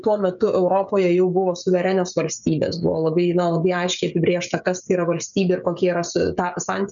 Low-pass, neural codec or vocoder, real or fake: 7.2 kHz; codec, 16 kHz, 16 kbps, FreqCodec, smaller model; fake